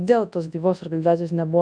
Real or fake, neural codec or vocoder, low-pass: fake; codec, 24 kHz, 0.9 kbps, WavTokenizer, large speech release; 9.9 kHz